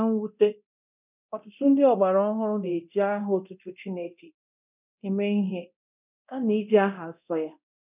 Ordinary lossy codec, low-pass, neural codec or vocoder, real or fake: none; 3.6 kHz; codec, 24 kHz, 0.9 kbps, DualCodec; fake